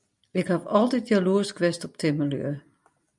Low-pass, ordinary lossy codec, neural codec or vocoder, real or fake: 10.8 kHz; MP3, 96 kbps; none; real